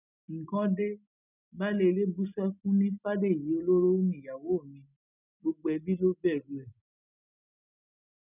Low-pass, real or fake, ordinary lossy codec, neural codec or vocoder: 3.6 kHz; real; none; none